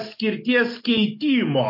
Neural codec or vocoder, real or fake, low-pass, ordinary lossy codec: none; real; 5.4 kHz; MP3, 32 kbps